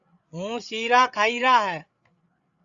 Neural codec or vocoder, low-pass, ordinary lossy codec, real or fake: codec, 16 kHz, 8 kbps, FreqCodec, larger model; 7.2 kHz; Opus, 64 kbps; fake